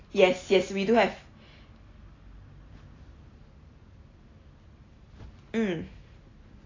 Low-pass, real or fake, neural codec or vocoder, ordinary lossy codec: 7.2 kHz; real; none; AAC, 32 kbps